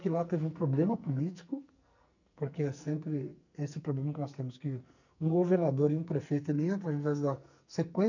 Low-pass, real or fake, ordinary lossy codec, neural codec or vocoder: 7.2 kHz; fake; none; codec, 44.1 kHz, 2.6 kbps, SNAC